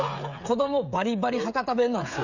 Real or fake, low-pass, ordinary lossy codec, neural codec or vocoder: fake; 7.2 kHz; none; codec, 16 kHz, 16 kbps, FreqCodec, smaller model